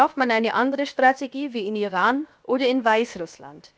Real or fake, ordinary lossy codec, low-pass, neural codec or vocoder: fake; none; none; codec, 16 kHz, 0.7 kbps, FocalCodec